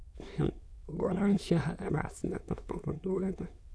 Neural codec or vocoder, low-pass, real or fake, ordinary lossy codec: autoencoder, 22.05 kHz, a latent of 192 numbers a frame, VITS, trained on many speakers; none; fake; none